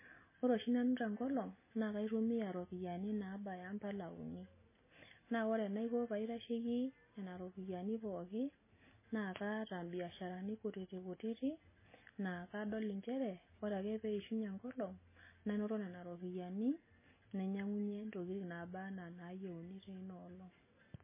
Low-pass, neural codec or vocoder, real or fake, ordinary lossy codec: 3.6 kHz; none; real; MP3, 16 kbps